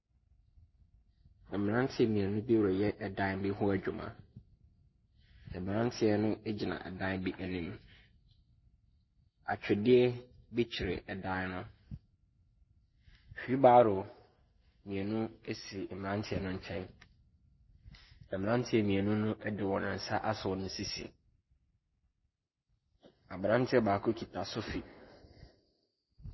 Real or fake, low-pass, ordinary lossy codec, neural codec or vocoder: real; 7.2 kHz; MP3, 24 kbps; none